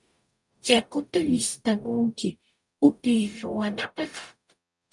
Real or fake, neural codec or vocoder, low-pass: fake; codec, 44.1 kHz, 0.9 kbps, DAC; 10.8 kHz